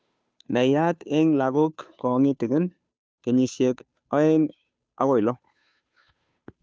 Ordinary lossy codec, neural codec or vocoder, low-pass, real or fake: none; codec, 16 kHz, 2 kbps, FunCodec, trained on Chinese and English, 25 frames a second; none; fake